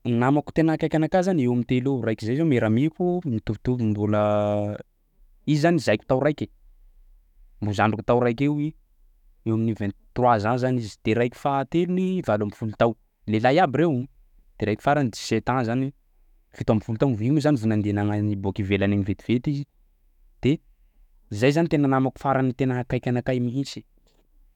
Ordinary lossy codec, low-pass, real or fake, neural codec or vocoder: none; 19.8 kHz; fake; autoencoder, 48 kHz, 128 numbers a frame, DAC-VAE, trained on Japanese speech